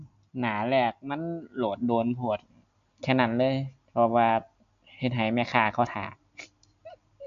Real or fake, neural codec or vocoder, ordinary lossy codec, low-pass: real; none; none; 7.2 kHz